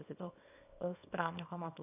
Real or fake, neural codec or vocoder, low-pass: fake; codec, 24 kHz, 0.9 kbps, WavTokenizer, small release; 3.6 kHz